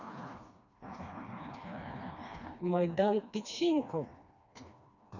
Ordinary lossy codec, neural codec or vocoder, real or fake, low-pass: none; codec, 16 kHz, 2 kbps, FreqCodec, smaller model; fake; 7.2 kHz